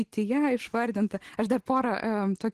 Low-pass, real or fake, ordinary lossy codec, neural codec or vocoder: 14.4 kHz; real; Opus, 16 kbps; none